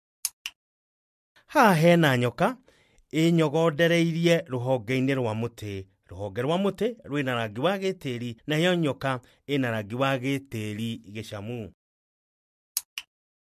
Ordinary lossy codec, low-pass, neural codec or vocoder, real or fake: MP3, 64 kbps; 14.4 kHz; none; real